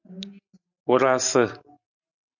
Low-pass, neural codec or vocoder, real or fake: 7.2 kHz; none; real